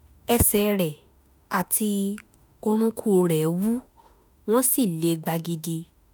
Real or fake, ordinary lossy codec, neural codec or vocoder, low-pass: fake; none; autoencoder, 48 kHz, 32 numbers a frame, DAC-VAE, trained on Japanese speech; none